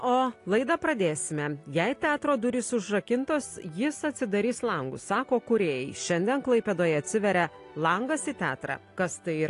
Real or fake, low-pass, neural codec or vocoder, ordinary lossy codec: real; 10.8 kHz; none; AAC, 48 kbps